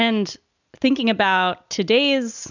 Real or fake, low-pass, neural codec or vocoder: real; 7.2 kHz; none